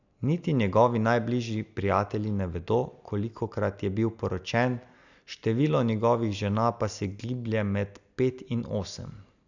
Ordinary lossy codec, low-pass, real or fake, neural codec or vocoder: none; 7.2 kHz; real; none